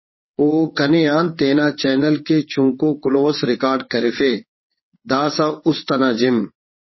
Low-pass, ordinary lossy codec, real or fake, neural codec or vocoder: 7.2 kHz; MP3, 24 kbps; fake; vocoder, 22.05 kHz, 80 mel bands, WaveNeXt